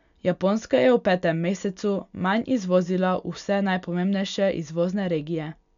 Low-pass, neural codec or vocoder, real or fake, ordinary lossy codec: 7.2 kHz; none; real; none